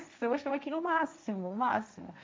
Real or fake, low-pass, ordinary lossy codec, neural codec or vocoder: fake; none; none; codec, 16 kHz, 1.1 kbps, Voila-Tokenizer